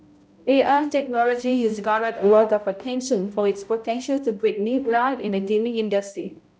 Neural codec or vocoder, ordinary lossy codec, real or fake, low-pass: codec, 16 kHz, 0.5 kbps, X-Codec, HuBERT features, trained on balanced general audio; none; fake; none